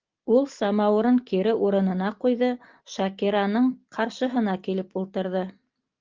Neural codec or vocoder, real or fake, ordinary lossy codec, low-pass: none; real; Opus, 32 kbps; 7.2 kHz